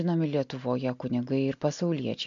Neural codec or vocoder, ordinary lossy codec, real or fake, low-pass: none; AAC, 64 kbps; real; 7.2 kHz